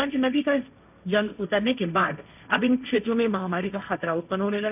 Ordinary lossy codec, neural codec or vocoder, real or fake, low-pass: none; codec, 16 kHz, 1.1 kbps, Voila-Tokenizer; fake; 3.6 kHz